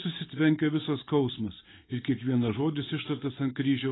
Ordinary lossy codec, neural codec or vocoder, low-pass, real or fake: AAC, 16 kbps; none; 7.2 kHz; real